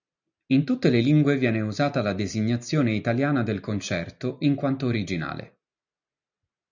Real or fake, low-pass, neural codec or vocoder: real; 7.2 kHz; none